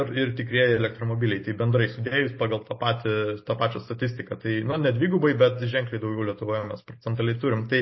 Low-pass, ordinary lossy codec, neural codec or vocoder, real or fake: 7.2 kHz; MP3, 24 kbps; none; real